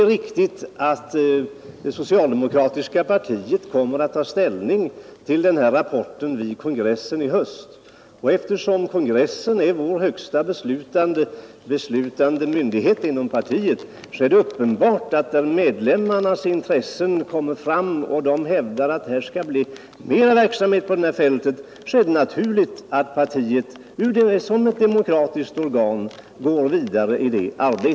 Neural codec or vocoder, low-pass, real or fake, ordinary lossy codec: none; none; real; none